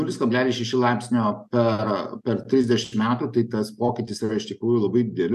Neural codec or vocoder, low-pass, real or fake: none; 14.4 kHz; real